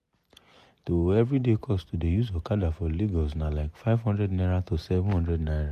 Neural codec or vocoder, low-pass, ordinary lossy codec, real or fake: none; 14.4 kHz; MP3, 64 kbps; real